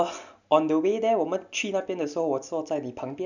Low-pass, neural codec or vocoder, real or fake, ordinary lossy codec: 7.2 kHz; none; real; none